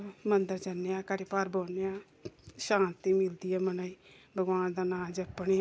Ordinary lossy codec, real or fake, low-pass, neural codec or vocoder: none; real; none; none